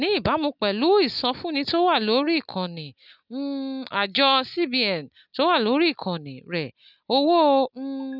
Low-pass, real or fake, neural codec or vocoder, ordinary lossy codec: 5.4 kHz; real; none; none